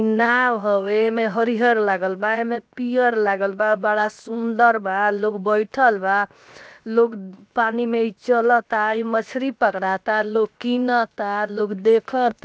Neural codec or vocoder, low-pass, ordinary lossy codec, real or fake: codec, 16 kHz, 0.7 kbps, FocalCodec; none; none; fake